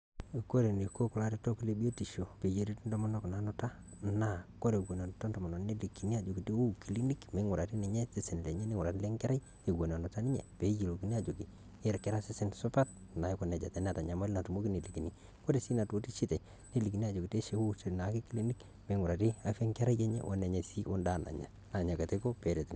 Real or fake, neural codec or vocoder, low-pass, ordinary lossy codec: real; none; none; none